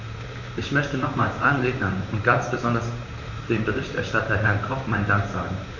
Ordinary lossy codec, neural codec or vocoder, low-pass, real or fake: none; vocoder, 44.1 kHz, 128 mel bands, Pupu-Vocoder; 7.2 kHz; fake